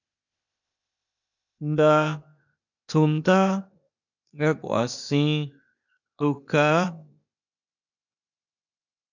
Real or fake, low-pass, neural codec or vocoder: fake; 7.2 kHz; codec, 16 kHz, 0.8 kbps, ZipCodec